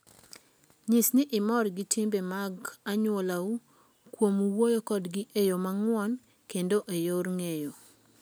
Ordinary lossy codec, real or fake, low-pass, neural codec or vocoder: none; real; none; none